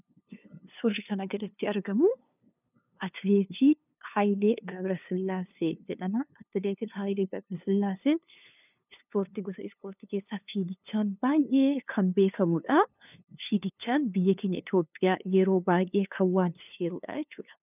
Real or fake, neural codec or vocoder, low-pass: fake; codec, 16 kHz, 2 kbps, FunCodec, trained on LibriTTS, 25 frames a second; 3.6 kHz